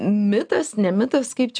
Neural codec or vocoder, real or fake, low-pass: none; real; 9.9 kHz